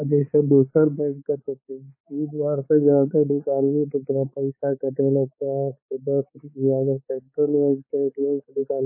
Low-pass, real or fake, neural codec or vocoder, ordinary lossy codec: 3.6 kHz; fake; codec, 16 kHz, 4 kbps, X-Codec, HuBERT features, trained on balanced general audio; MP3, 16 kbps